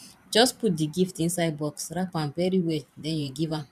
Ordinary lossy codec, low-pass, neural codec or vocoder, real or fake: none; 14.4 kHz; vocoder, 44.1 kHz, 128 mel bands every 512 samples, BigVGAN v2; fake